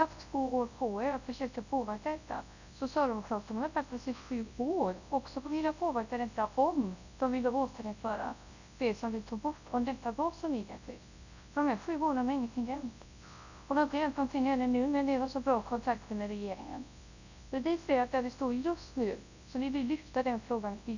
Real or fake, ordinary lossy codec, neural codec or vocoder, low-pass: fake; none; codec, 24 kHz, 0.9 kbps, WavTokenizer, large speech release; 7.2 kHz